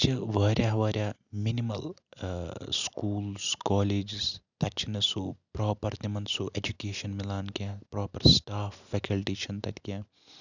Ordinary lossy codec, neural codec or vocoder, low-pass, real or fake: none; none; 7.2 kHz; real